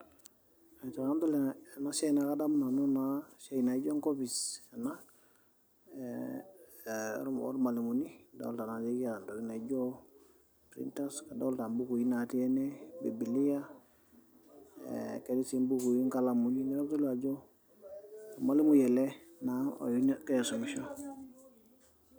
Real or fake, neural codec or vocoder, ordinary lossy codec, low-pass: real; none; none; none